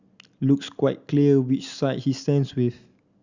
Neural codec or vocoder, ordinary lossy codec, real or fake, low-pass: none; Opus, 64 kbps; real; 7.2 kHz